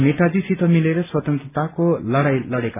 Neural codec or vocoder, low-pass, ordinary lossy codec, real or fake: none; 3.6 kHz; AAC, 32 kbps; real